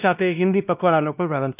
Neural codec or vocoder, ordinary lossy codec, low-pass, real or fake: codec, 16 kHz, 1 kbps, X-Codec, WavLM features, trained on Multilingual LibriSpeech; none; 3.6 kHz; fake